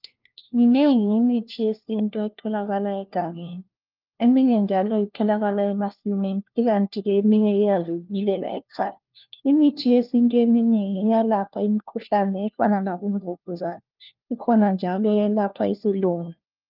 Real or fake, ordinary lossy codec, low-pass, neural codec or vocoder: fake; Opus, 24 kbps; 5.4 kHz; codec, 16 kHz, 1 kbps, FunCodec, trained on LibriTTS, 50 frames a second